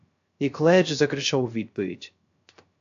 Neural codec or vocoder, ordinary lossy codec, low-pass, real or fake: codec, 16 kHz, 0.3 kbps, FocalCodec; AAC, 64 kbps; 7.2 kHz; fake